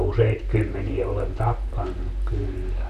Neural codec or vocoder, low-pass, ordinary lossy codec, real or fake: vocoder, 44.1 kHz, 128 mel bands, Pupu-Vocoder; 14.4 kHz; none; fake